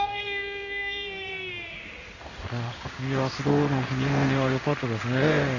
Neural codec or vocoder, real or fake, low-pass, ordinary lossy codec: codec, 16 kHz in and 24 kHz out, 1 kbps, XY-Tokenizer; fake; 7.2 kHz; AAC, 48 kbps